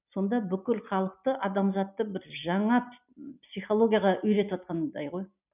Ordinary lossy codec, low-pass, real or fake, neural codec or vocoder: none; 3.6 kHz; real; none